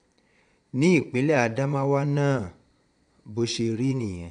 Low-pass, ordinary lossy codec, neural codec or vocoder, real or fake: 9.9 kHz; none; vocoder, 22.05 kHz, 80 mel bands, Vocos; fake